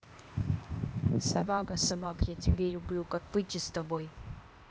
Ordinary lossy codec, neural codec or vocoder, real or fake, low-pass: none; codec, 16 kHz, 0.8 kbps, ZipCodec; fake; none